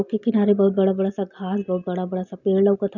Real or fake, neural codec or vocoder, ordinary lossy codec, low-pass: real; none; none; 7.2 kHz